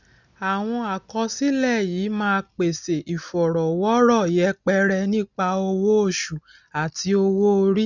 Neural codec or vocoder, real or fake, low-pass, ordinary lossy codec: none; real; 7.2 kHz; none